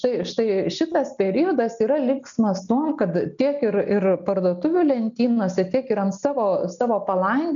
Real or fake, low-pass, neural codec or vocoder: real; 7.2 kHz; none